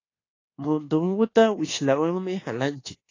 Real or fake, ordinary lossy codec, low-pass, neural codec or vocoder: fake; AAC, 32 kbps; 7.2 kHz; codec, 24 kHz, 1.2 kbps, DualCodec